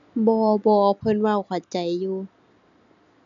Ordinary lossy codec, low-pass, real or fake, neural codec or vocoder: none; 7.2 kHz; real; none